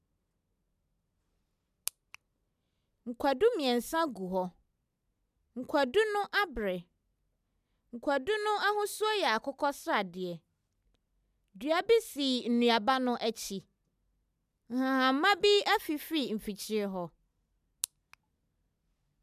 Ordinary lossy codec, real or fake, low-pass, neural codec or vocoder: none; real; 14.4 kHz; none